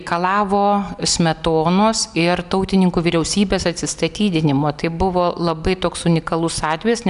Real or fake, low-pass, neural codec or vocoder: real; 10.8 kHz; none